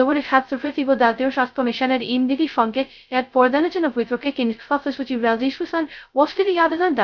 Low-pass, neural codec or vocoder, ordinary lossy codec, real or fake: 7.2 kHz; codec, 16 kHz, 0.2 kbps, FocalCodec; none; fake